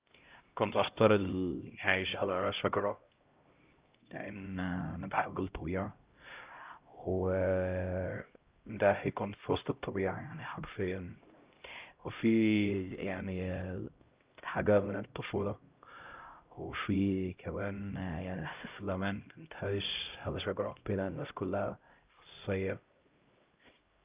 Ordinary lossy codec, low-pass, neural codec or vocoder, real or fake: Opus, 24 kbps; 3.6 kHz; codec, 16 kHz, 0.5 kbps, X-Codec, HuBERT features, trained on LibriSpeech; fake